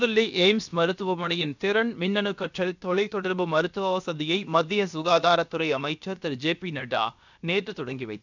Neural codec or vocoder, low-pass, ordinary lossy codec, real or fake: codec, 16 kHz, about 1 kbps, DyCAST, with the encoder's durations; 7.2 kHz; none; fake